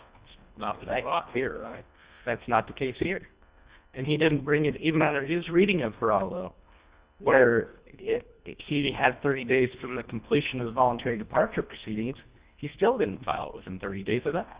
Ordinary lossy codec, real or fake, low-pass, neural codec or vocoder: Opus, 64 kbps; fake; 3.6 kHz; codec, 24 kHz, 1.5 kbps, HILCodec